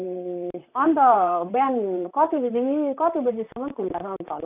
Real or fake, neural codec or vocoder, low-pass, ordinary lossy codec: fake; vocoder, 44.1 kHz, 128 mel bands, Pupu-Vocoder; 3.6 kHz; none